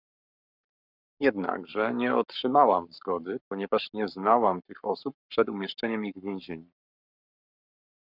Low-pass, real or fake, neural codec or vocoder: 5.4 kHz; fake; codec, 44.1 kHz, 7.8 kbps, Pupu-Codec